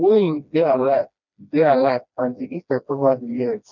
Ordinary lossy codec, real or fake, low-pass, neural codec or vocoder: none; fake; 7.2 kHz; codec, 16 kHz, 1 kbps, FreqCodec, smaller model